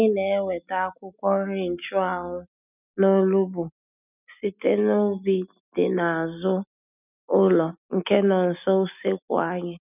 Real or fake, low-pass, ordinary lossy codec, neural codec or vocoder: real; 3.6 kHz; none; none